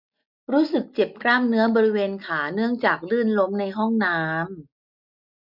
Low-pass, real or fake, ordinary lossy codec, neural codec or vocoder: 5.4 kHz; real; none; none